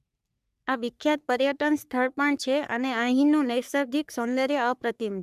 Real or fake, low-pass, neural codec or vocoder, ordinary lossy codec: fake; 14.4 kHz; codec, 44.1 kHz, 3.4 kbps, Pupu-Codec; none